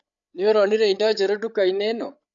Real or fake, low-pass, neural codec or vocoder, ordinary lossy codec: fake; 7.2 kHz; codec, 16 kHz, 8 kbps, FreqCodec, larger model; none